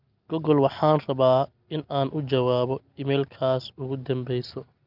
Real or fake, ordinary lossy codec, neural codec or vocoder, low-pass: real; Opus, 24 kbps; none; 5.4 kHz